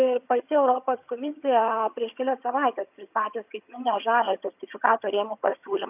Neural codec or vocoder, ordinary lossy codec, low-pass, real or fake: vocoder, 22.05 kHz, 80 mel bands, HiFi-GAN; AAC, 32 kbps; 3.6 kHz; fake